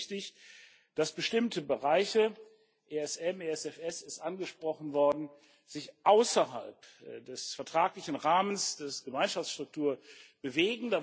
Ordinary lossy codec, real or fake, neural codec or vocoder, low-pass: none; real; none; none